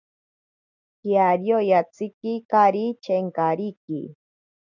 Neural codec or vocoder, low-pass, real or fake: none; 7.2 kHz; real